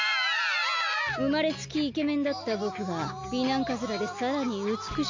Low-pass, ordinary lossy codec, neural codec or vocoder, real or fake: 7.2 kHz; MP3, 64 kbps; none; real